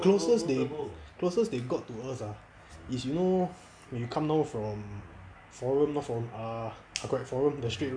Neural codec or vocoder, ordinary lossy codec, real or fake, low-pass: none; none; real; 9.9 kHz